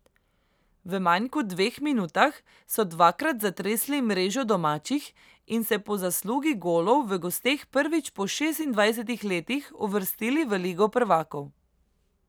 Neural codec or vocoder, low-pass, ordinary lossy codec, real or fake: none; none; none; real